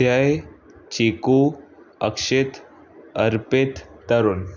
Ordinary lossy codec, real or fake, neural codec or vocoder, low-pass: none; real; none; 7.2 kHz